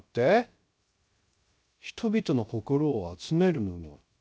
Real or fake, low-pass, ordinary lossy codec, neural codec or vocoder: fake; none; none; codec, 16 kHz, 0.3 kbps, FocalCodec